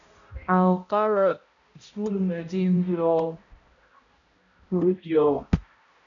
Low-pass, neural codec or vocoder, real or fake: 7.2 kHz; codec, 16 kHz, 0.5 kbps, X-Codec, HuBERT features, trained on balanced general audio; fake